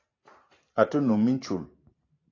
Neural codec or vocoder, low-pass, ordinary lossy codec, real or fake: vocoder, 44.1 kHz, 128 mel bands every 512 samples, BigVGAN v2; 7.2 kHz; AAC, 32 kbps; fake